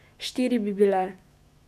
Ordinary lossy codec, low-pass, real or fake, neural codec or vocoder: none; 14.4 kHz; fake; autoencoder, 48 kHz, 128 numbers a frame, DAC-VAE, trained on Japanese speech